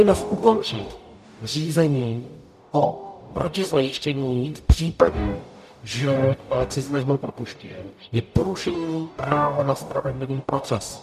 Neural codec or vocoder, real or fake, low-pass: codec, 44.1 kHz, 0.9 kbps, DAC; fake; 14.4 kHz